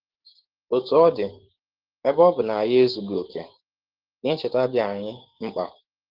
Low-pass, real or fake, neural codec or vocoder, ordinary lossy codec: 5.4 kHz; fake; codec, 16 kHz, 6 kbps, DAC; Opus, 16 kbps